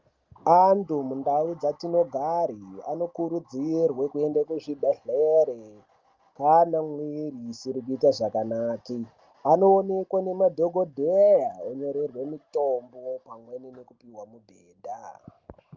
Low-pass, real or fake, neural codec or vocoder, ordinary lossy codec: 7.2 kHz; real; none; Opus, 24 kbps